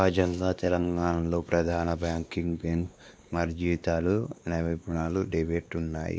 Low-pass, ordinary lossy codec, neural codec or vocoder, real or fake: none; none; codec, 16 kHz, 2 kbps, X-Codec, WavLM features, trained on Multilingual LibriSpeech; fake